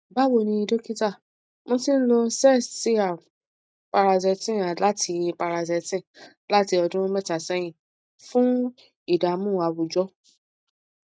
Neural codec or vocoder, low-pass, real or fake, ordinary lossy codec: none; none; real; none